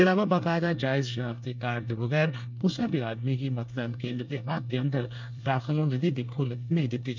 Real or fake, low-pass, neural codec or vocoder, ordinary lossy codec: fake; 7.2 kHz; codec, 24 kHz, 1 kbps, SNAC; MP3, 64 kbps